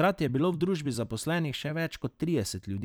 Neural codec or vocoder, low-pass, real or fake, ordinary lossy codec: vocoder, 44.1 kHz, 128 mel bands every 256 samples, BigVGAN v2; none; fake; none